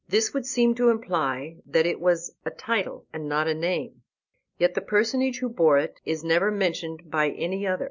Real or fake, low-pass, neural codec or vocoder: real; 7.2 kHz; none